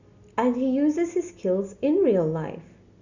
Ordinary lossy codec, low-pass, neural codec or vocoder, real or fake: Opus, 64 kbps; 7.2 kHz; none; real